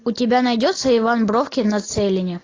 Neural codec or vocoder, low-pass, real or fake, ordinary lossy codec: none; 7.2 kHz; real; AAC, 32 kbps